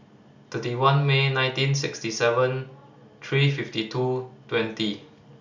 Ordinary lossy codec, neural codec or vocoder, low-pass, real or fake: none; none; 7.2 kHz; real